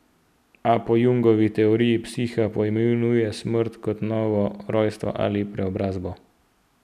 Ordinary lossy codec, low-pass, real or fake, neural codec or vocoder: none; 14.4 kHz; real; none